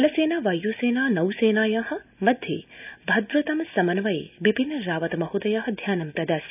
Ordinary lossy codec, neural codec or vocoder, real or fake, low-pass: none; none; real; 3.6 kHz